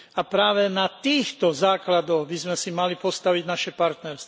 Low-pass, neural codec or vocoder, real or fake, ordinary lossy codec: none; none; real; none